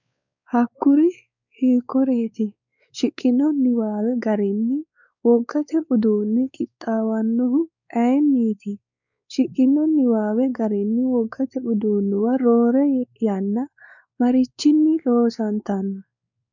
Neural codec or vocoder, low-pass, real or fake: codec, 16 kHz, 4 kbps, X-Codec, WavLM features, trained on Multilingual LibriSpeech; 7.2 kHz; fake